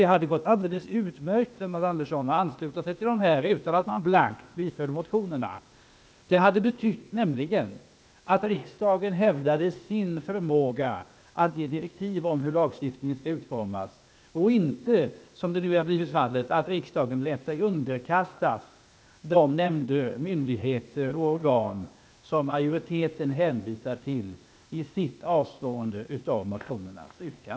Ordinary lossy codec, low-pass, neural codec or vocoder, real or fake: none; none; codec, 16 kHz, 0.8 kbps, ZipCodec; fake